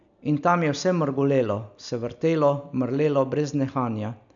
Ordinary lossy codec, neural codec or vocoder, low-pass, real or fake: none; none; 7.2 kHz; real